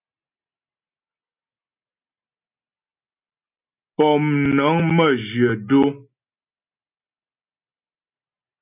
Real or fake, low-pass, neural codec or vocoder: real; 3.6 kHz; none